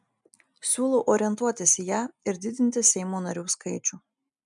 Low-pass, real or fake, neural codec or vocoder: 10.8 kHz; real; none